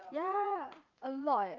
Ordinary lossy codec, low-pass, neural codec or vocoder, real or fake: Opus, 24 kbps; 7.2 kHz; vocoder, 44.1 kHz, 80 mel bands, Vocos; fake